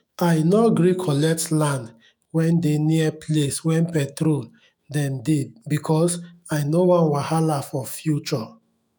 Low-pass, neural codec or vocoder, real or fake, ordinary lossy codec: none; autoencoder, 48 kHz, 128 numbers a frame, DAC-VAE, trained on Japanese speech; fake; none